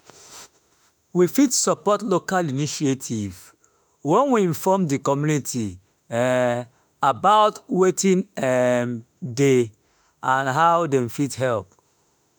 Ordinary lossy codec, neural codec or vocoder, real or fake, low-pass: none; autoencoder, 48 kHz, 32 numbers a frame, DAC-VAE, trained on Japanese speech; fake; none